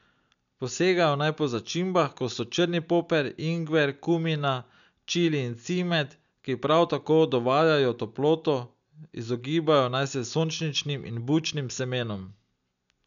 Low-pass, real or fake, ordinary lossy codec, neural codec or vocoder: 7.2 kHz; real; none; none